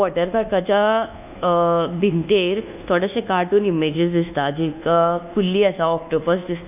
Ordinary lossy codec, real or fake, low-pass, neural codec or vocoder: none; fake; 3.6 kHz; codec, 24 kHz, 1.2 kbps, DualCodec